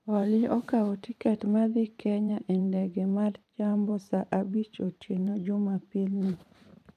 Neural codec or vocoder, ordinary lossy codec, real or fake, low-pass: vocoder, 44.1 kHz, 128 mel bands every 512 samples, BigVGAN v2; none; fake; 14.4 kHz